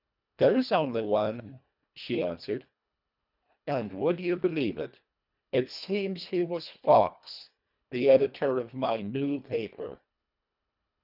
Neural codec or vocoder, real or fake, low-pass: codec, 24 kHz, 1.5 kbps, HILCodec; fake; 5.4 kHz